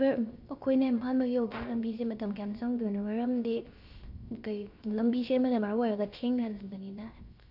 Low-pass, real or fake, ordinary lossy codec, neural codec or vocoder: 5.4 kHz; fake; none; codec, 16 kHz, 0.7 kbps, FocalCodec